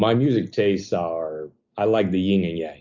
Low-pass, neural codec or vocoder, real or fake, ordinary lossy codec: 7.2 kHz; none; real; MP3, 64 kbps